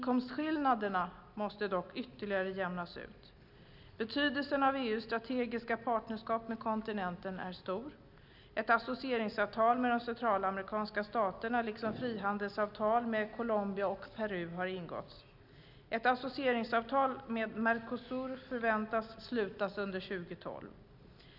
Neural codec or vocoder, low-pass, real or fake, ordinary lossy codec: none; 5.4 kHz; real; none